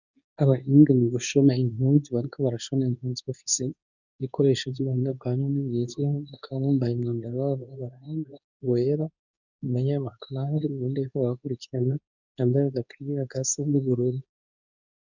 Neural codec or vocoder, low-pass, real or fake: codec, 24 kHz, 0.9 kbps, WavTokenizer, medium speech release version 2; 7.2 kHz; fake